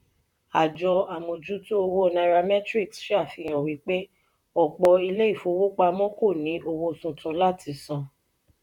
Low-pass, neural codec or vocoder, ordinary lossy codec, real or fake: 19.8 kHz; vocoder, 44.1 kHz, 128 mel bands, Pupu-Vocoder; none; fake